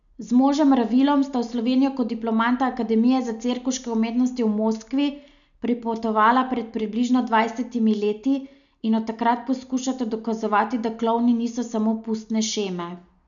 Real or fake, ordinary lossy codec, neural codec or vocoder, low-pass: real; none; none; 7.2 kHz